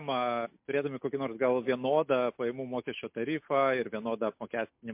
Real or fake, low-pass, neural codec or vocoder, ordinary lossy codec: real; 3.6 kHz; none; MP3, 32 kbps